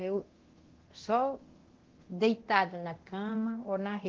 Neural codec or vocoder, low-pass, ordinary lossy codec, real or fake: codec, 24 kHz, 0.9 kbps, DualCodec; 7.2 kHz; Opus, 16 kbps; fake